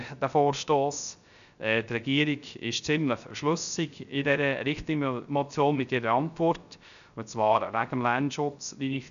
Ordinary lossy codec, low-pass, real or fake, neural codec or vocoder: none; 7.2 kHz; fake; codec, 16 kHz, 0.3 kbps, FocalCodec